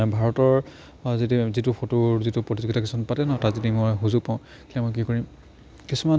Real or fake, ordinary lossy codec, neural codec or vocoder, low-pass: real; none; none; none